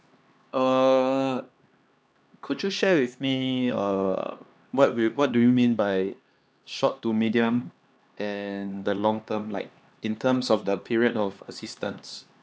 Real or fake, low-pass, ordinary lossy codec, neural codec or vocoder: fake; none; none; codec, 16 kHz, 2 kbps, X-Codec, HuBERT features, trained on LibriSpeech